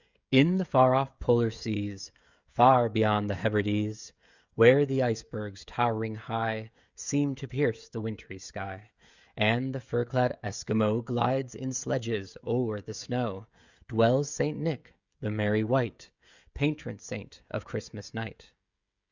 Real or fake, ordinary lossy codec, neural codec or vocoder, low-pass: fake; Opus, 64 kbps; codec, 16 kHz, 16 kbps, FreqCodec, smaller model; 7.2 kHz